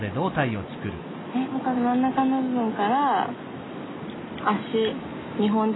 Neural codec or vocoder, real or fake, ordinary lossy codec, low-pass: none; real; AAC, 16 kbps; 7.2 kHz